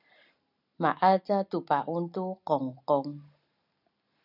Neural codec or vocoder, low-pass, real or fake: none; 5.4 kHz; real